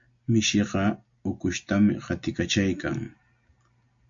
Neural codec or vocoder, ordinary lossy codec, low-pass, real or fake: none; AAC, 64 kbps; 7.2 kHz; real